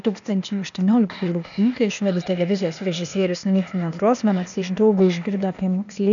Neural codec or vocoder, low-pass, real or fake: codec, 16 kHz, 0.8 kbps, ZipCodec; 7.2 kHz; fake